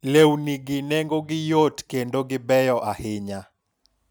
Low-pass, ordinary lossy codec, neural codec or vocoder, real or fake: none; none; none; real